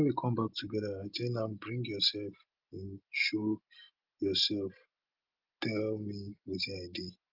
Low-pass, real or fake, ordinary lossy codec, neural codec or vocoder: 5.4 kHz; real; Opus, 32 kbps; none